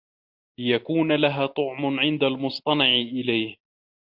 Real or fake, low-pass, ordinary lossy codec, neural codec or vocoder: real; 5.4 kHz; MP3, 48 kbps; none